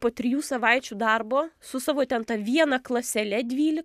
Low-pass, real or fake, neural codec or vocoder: 14.4 kHz; real; none